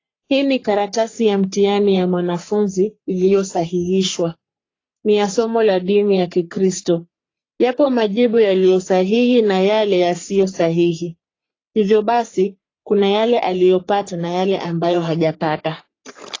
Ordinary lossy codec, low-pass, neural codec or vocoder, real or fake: AAC, 32 kbps; 7.2 kHz; codec, 44.1 kHz, 3.4 kbps, Pupu-Codec; fake